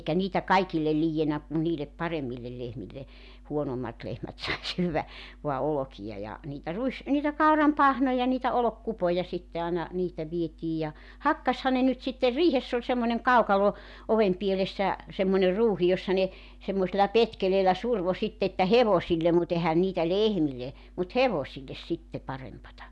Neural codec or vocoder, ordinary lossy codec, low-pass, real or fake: none; none; none; real